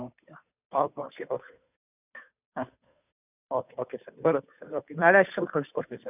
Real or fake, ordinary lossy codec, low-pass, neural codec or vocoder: fake; none; 3.6 kHz; codec, 16 kHz, 2 kbps, FunCodec, trained on Chinese and English, 25 frames a second